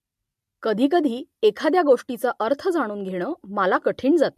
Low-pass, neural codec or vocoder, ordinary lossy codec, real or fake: 14.4 kHz; none; MP3, 64 kbps; real